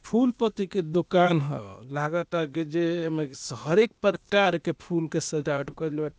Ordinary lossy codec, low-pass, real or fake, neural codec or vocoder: none; none; fake; codec, 16 kHz, 0.8 kbps, ZipCodec